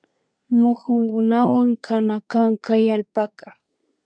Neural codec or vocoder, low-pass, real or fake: codec, 24 kHz, 1 kbps, SNAC; 9.9 kHz; fake